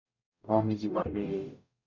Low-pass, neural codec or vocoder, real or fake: 7.2 kHz; codec, 44.1 kHz, 0.9 kbps, DAC; fake